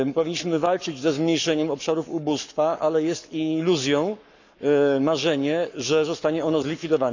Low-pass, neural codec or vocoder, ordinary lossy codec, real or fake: 7.2 kHz; codec, 44.1 kHz, 7.8 kbps, Pupu-Codec; none; fake